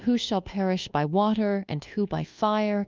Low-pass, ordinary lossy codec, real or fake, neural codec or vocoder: 7.2 kHz; Opus, 32 kbps; fake; codec, 24 kHz, 1.2 kbps, DualCodec